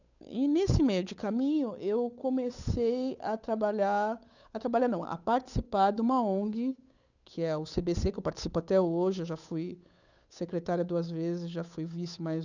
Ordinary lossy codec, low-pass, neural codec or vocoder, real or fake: none; 7.2 kHz; codec, 16 kHz, 8 kbps, FunCodec, trained on Chinese and English, 25 frames a second; fake